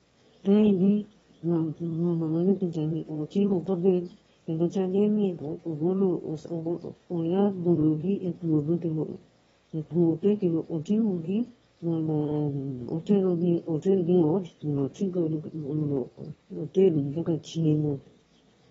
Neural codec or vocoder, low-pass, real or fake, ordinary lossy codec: autoencoder, 22.05 kHz, a latent of 192 numbers a frame, VITS, trained on one speaker; 9.9 kHz; fake; AAC, 24 kbps